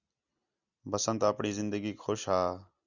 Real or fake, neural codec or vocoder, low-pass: real; none; 7.2 kHz